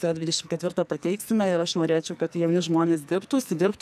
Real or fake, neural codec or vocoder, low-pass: fake; codec, 44.1 kHz, 2.6 kbps, SNAC; 14.4 kHz